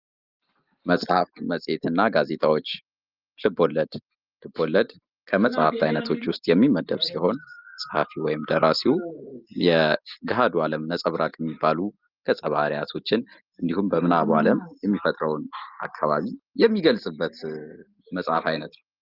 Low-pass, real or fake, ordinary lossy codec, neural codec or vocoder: 5.4 kHz; real; Opus, 24 kbps; none